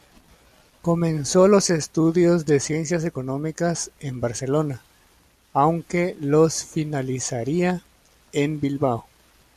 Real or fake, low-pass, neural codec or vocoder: real; 14.4 kHz; none